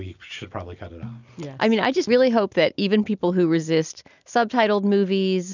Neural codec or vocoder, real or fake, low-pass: none; real; 7.2 kHz